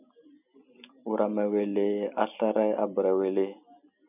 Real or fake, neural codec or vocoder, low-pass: real; none; 3.6 kHz